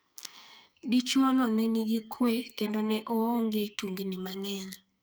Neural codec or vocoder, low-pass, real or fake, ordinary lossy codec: codec, 44.1 kHz, 2.6 kbps, SNAC; none; fake; none